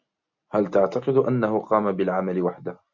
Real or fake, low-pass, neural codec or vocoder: real; 7.2 kHz; none